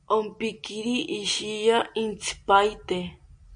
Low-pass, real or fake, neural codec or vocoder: 9.9 kHz; real; none